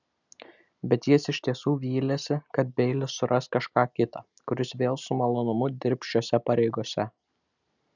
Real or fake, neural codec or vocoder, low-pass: real; none; 7.2 kHz